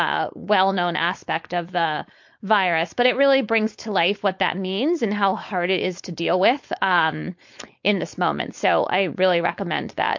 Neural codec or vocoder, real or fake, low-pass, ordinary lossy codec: codec, 16 kHz, 4.8 kbps, FACodec; fake; 7.2 kHz; MP3, 48 kbps